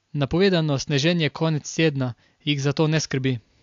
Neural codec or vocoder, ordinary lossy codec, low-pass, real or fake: none; AAC, 64 kbps; 7.2 kHz; real